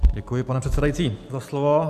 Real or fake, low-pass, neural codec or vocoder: real; 14.4 kHz; none